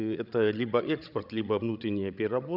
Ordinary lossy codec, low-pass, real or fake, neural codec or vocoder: none; 5.4 kHz; fake; codec, 16 kHz, 16 kbps, FreqCodec, larger model